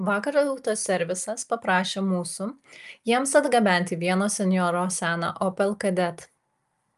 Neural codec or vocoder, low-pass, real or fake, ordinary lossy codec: none; 14.4 kHz; real; Opus, 32 kbps